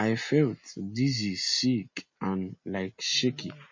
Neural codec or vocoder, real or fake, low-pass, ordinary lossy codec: none; real; 7.2 kHz; MP3, 32 kbps